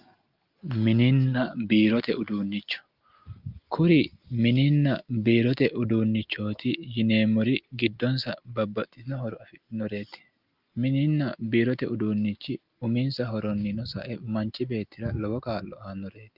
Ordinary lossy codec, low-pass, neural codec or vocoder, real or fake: Opus, 32 kbps; 5.4 kHz; none; real